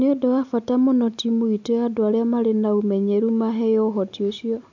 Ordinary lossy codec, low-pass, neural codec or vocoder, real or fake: MP3, 64 kbps; 7.2 kHz; none; real